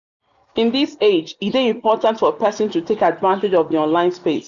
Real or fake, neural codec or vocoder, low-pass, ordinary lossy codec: real; none; 7.2 kHz; none